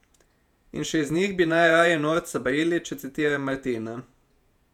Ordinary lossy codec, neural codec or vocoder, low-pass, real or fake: none; vocoder, 48 kHz, 128 mel bands, Vocos; 19.8 kHz; fake